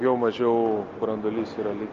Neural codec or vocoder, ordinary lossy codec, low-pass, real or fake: none; Opus, 24 kbps; 7.2 kHz; real